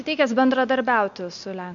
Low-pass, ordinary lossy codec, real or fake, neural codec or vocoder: 7.2 kHz; AAC, 64 kbps; real; none